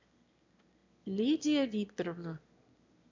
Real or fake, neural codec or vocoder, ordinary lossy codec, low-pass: fake; autoencoder, 22.05 kHz, a latent of 192 numbers a frame, VITS, trained on one speaker; MP3, 64 kbps; 7.2 kHz